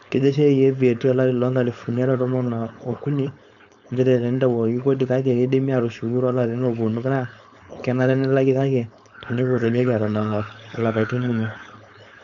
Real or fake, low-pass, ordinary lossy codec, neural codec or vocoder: fake; 7.2 kHz; none; codec, 16 kHz, 4.8 kbps, FACodec